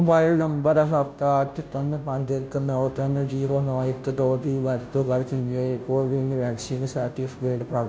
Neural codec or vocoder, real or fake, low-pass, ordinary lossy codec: codec, 16 kHz, 0.5 kbps, FunCodec, trained on Chinese and English, 25 frames a second; fake; none; none